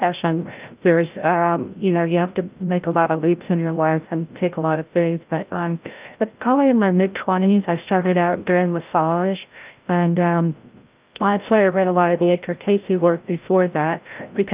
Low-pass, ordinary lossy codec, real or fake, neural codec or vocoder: 3.6 kHz; Opus, 32 kbps; fake; codec, 16 kHz, 0.5 kbps, FreqCodec, larger model